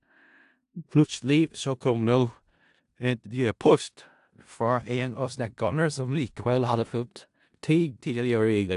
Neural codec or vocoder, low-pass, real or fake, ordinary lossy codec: codec, 16 kHz in and 24 kHz out, 0.4 kbps, LongCat-Audio-Codec, four codebook decoder; 10.8 kHz; fake; AAC, 64 kbps